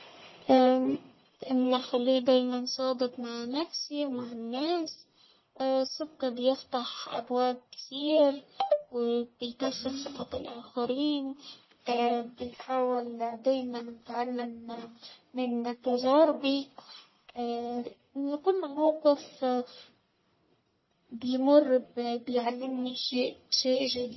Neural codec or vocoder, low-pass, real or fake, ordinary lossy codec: codec, 44.1 kHz, 1.7 kbps, Pupu-Codec; 7.2 kHz; fake; MP3, 24 kbps